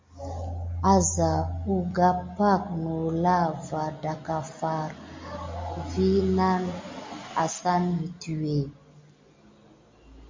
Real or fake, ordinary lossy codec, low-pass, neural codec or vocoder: real; MP3, 48 kbps; 7.2 kHz; none